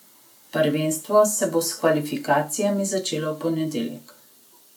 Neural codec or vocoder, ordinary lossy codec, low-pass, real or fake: none; none; 19.8 kHz; real